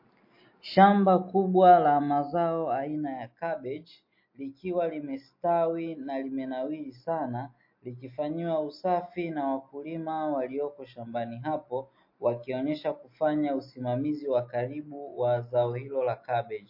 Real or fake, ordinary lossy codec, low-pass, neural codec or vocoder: real; MP3, 32 kbps; 5.4 kHz; none